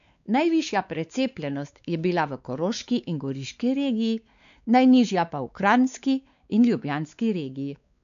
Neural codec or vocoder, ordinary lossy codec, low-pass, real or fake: codec, 16 kHz, 4 kbps, X-Codec, WavLM features, trained on Multilingual LibriSpeech; none; 7.2 kHz; fake